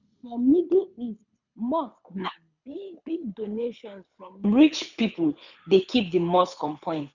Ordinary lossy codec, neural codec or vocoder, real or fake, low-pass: none; codec, 24 kHz, 6 kbps, HILCodec; fake; 7.2 kHz